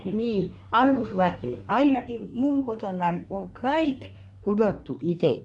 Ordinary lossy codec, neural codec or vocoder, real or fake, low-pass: MP3, 96 kbps; codec, 24 kHz, 1 kbps, SNAC; fake; 10.8 kHz